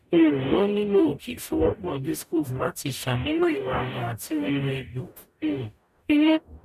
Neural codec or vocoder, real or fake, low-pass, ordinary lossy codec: codec, 44.1 kHz, 0.9 kbps, DAC; fake; 14.4 kHz; none